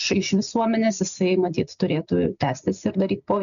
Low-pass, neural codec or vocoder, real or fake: 7.2 kHz; none; real